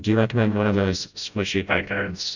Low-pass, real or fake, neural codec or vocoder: 7.2 kHz; fake; codec, 16 kHz, 0.5 kbps, FreqCodec, smaller model